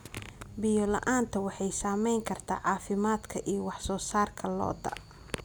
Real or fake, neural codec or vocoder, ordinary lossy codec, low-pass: real; none; none; none